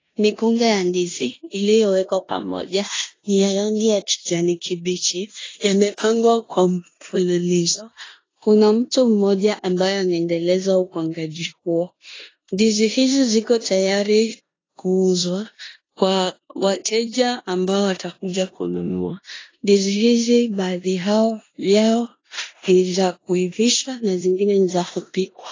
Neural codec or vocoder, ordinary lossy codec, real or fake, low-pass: codec, 16 kHz in and 24 kHz out, 0.9 kbps, LongCat-Audio-Codec, four codebook decoder; AAC, 32 kbps; fake; 7.2 kHz